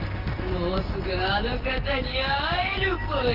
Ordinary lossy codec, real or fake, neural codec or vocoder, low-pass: Opus, 32 kbps; real; none; 5.4 kHz